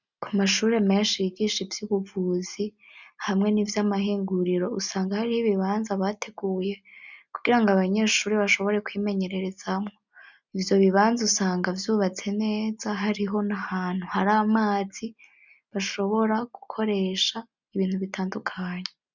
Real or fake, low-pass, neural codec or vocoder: real; 7.2 kHz; none